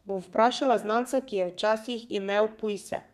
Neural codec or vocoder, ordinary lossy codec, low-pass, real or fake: codec, 32 kHz, 1.9 kbps, SNAC; none; 14.4 kHz; fake